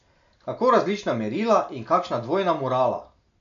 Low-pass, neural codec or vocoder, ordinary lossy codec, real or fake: 7.2 kHz; none; none; real